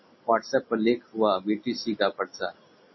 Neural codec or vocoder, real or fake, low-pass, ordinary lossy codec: none; real; 7.2 kHz; MP3, 24 kbps